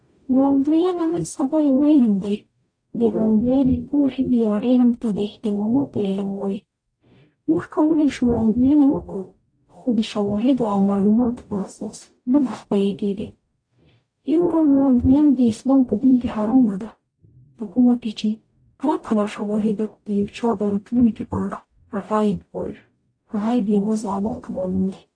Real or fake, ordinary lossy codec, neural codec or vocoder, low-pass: fake; AAC, 48 kbps; codec, 44.1 kHz, 0.9 kbps, DAC; 9.9 kHz